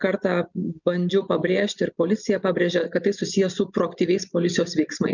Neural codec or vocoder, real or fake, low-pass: none; real; 7.2 kHz